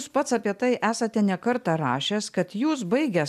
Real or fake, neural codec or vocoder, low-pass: real; none; 14.4 kHz